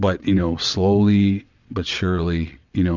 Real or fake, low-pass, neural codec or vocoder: fake; 7.2 kHz; vocoder, 22.05 kHz, 80 mel bands, WaveNeXt